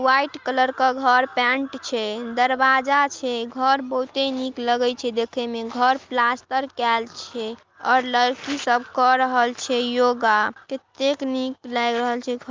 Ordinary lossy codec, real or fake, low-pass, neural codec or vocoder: Opus, 32 kbps; real; 7.2 kHz; none